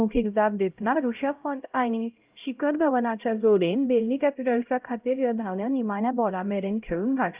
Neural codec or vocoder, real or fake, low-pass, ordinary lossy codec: codec, 16 kHz, 0.5 kbps, X-Codec, HuBERT features, trained on LibriSpeech; fake; 3.6 kHz; Opus, 32 kbps